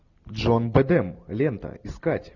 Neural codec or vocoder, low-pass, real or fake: none; 7.2 kHz; real